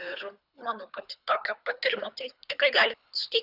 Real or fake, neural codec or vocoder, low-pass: fake; codec, 24 kHz, 3 kbps, HILCodec; 5.4 kHz